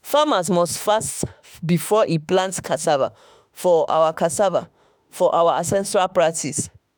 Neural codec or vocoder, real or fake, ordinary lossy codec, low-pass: autoencoder, 48 kHz, 32 numbers a frame, DAC-VAE, trained on Japanese speech; fake; none; none